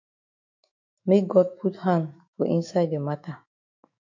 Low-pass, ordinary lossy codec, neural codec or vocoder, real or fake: 7.2 kHz; MP3, 48 kbps; autoencoder, 48 kHz, 128 numbers a frame, DAC-VAE, trained on Japanese speech; fake